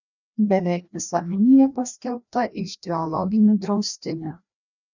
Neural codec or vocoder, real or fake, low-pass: codec, 16 kHz, 1 kbps, FreqCodec, larger model; fake; 7.2 kHz